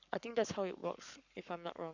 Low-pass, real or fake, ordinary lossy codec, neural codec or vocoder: 7.2 kHz; fake; none; codec, 44.1 kHz, 7.8 kbps, Pupu-Codec